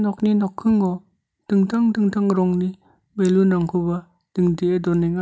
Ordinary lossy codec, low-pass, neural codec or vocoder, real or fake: none; none; none; real